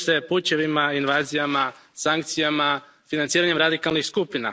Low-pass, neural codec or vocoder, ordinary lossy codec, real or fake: none; none; none; real